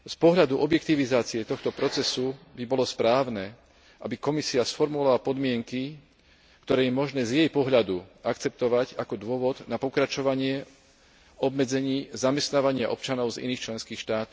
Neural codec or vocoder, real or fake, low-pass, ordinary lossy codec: none; real; none; none